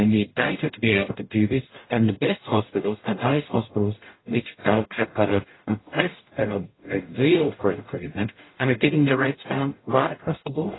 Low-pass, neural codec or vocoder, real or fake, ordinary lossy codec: 7.2 kHz; codec, 44.1 kHz, 0.9 kbps, DAC; fake; AAC, 16 kbps